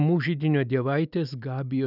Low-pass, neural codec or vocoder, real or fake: 5.4 kHz; none; real